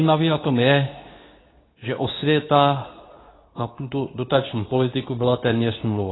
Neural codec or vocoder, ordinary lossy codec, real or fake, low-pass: codec, 24 kHz, 0.9 kbps, WavTokenizer, medium speech release version 2; AAC, 16 kbps; fake; 7.2 kHz